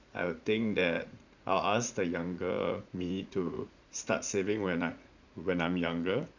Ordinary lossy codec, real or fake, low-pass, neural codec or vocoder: none; fake; 7.2 kHz; vocoder, 44.1 kHz, 80 mel bands, Vocos